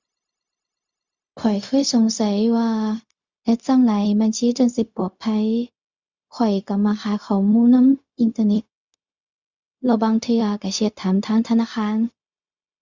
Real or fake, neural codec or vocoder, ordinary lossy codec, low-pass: fake; codec, 16 kHz, 0.4 kbps, LongCat-Audio-Codec; Opus, 64 kbps; 7.2 kHz